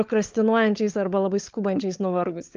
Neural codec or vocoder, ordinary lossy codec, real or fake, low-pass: codec, 16 kHz, 16 kbps, FunCodec, trained on LibriTTS, 50 frames a second; Opus, 32 kbps; fake; 7.2 kHz